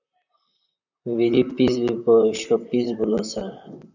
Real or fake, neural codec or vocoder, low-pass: fake; vocoder, 44.1 kHz, 128 mel bands, Pupu-Vocoder; 7.2 kHz